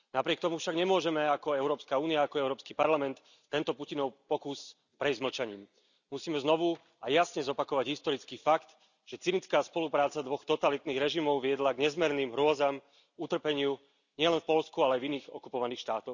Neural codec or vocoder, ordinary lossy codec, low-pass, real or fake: none; none; 7.2 kHz; real